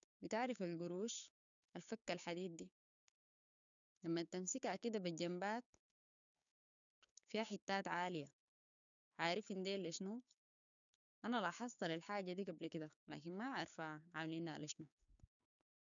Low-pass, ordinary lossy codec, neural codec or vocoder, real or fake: 7.2 kHz; none; codec, 16 kHz, 6 kbps, DAC; fake